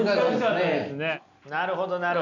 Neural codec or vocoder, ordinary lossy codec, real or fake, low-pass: none; none; real; 7.2 kHz